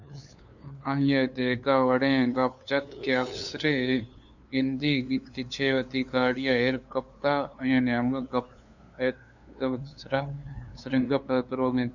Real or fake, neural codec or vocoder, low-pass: fake; codec, 16 kHz, 2 kbps, FunCodec, trained on LibriTTS, 25 frames a second; 7.2 kHz